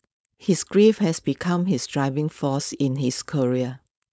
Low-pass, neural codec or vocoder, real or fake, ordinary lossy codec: none; codec, 16 kHz, 4.8 kbps, FACodec; fake; none